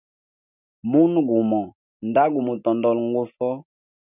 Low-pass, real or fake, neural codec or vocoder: 3.6 kHz; real; none